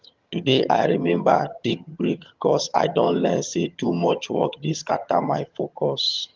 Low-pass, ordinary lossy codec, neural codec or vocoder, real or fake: 7.2 kHz; Opus, 32 kbps; vocoder, 22.05 kHz, 80 mel bands, HiFi-GAN; fake